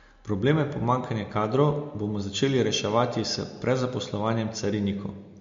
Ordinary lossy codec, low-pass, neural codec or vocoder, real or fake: MP3, 48 kbps; 7.2 kHz; none; real